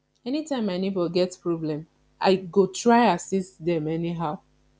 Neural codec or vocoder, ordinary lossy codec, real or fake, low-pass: none; none; real; none